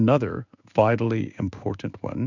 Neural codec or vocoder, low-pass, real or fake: codec, 16 kHz in and 24 kHz out, 1 kbps, XY-Tokenizer; 7.2 kHz; fake